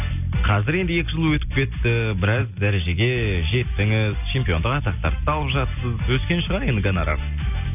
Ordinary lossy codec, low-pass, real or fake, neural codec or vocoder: none; 3.6 kHz; real; none